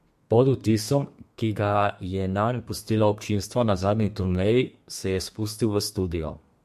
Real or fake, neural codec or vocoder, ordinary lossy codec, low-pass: fake; codec, 32 kHz, 1.9 kbps, SNAC; MP3, 64 kbps; 14.4 kHz